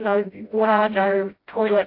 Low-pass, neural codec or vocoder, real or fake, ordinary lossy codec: 5.4 kHz; codec, 16 kHz, 0.5 kbps, FreqCodec, smaller model; fake; AAC, 32 kbps